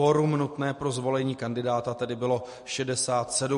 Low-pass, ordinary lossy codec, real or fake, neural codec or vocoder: 14.4 kHz; MP3, 48 kbps; real; none